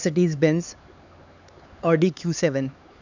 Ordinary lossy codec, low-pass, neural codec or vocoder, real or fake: none; 7.2 kHz; codec, 16 kHz, 4 kbps, X-Codec, HuBERT features, trained on LibriSpeech; fake